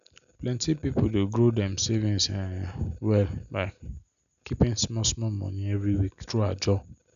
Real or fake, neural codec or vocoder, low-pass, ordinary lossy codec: real; none; 7.2 kHz; none